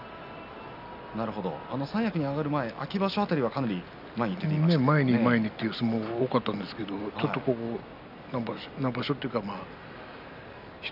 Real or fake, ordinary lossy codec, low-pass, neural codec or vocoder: real; none; 5.4 kHz; none